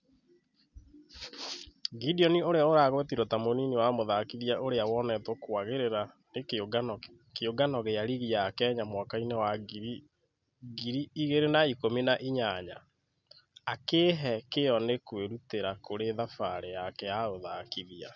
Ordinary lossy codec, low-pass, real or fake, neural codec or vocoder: none; 7.2 kHz; real; none